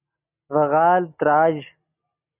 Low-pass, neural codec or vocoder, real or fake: 3.6 kHz; none; real